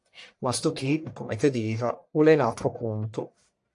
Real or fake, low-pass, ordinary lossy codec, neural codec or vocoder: fake; 10.8 kHz; AAC, 64 kbps; codec, 44.1 kHz, 1.7 kbps, Pupu-Codec